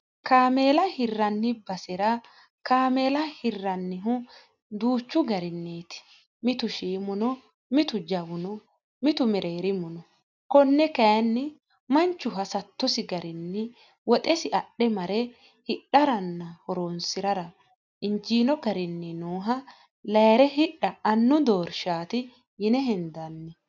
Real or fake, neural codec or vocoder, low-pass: real; none; 7.2 kHz